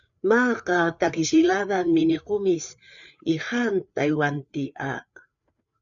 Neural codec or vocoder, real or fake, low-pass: codec, 16 kHz, 4 kbps, FreqCodec, larger model; fake; 7.2 kHz